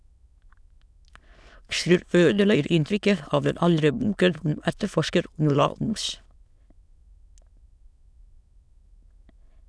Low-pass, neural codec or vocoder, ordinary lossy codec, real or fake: none; autoencoder, 22.05 kHz, a latent of 192 numbers a frame, VITS, trained on many speakers; none; fake